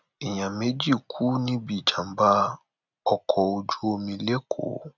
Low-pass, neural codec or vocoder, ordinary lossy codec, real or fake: 7.2 kHz; none; none; real